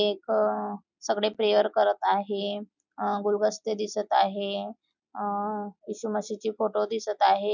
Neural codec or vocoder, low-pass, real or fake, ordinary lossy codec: none; 7.2 kHz; real; none